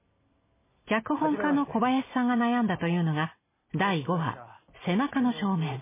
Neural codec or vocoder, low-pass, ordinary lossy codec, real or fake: none; 3.6 kHz; MP3, 16 kbps; real